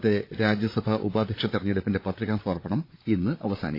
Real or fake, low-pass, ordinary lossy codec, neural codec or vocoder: fake; 5.4 kHz; AAC, 32 kbps; codec, 16 kHz, 8 kbps, FreqCodec, larger model